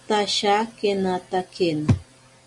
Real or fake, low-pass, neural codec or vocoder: real; 10.8 kHz; none